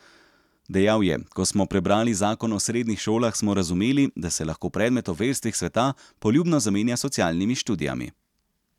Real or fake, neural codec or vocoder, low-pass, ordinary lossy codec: real; none; 19.8 kHz; none